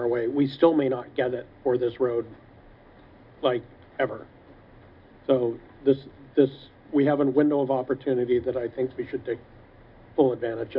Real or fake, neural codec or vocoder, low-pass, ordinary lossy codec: real; none; 5.4 kHz; AAC, 48 kbps